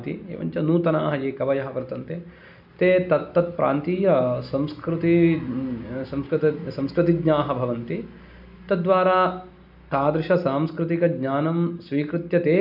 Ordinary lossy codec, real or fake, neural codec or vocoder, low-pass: none; real; none; 5.4 kHz